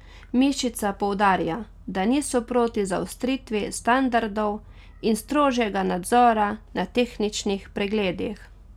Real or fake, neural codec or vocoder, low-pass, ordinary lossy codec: real; none; 19.8 kHz; none